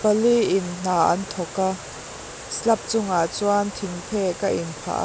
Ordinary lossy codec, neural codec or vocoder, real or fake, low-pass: none; none; real; none